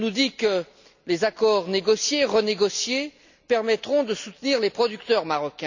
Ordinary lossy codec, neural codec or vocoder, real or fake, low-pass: none; none; real; 7.2 kHz